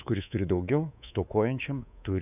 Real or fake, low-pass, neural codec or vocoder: fake; 3.6 kHz; codec, 24 kHz, 3.1 kbps, DualCodec